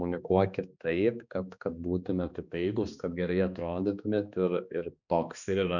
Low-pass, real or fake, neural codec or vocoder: 7.2 kHz; fake; codec, 16 kHz, 2 kbps, X-Codec, HuBERT features, trained on balanced general audio